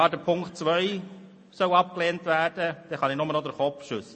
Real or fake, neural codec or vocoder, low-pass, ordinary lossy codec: real; none; 10.8 kHz; MP3, 32 kbps